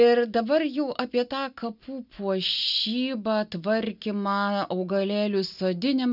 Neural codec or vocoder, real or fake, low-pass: none; real; 5.4 kHz